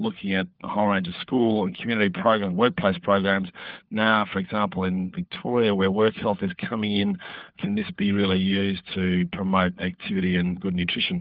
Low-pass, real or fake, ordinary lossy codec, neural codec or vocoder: 5.4 kHz; fake; Opus, 32 kbps; codec, 16 kHz, 4 kbps, FunCodec, trained on LibriTTS, 50 frames a second